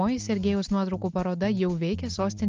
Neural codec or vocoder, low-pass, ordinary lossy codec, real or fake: none; 7.2 kHz; Opus, 24 kbps; real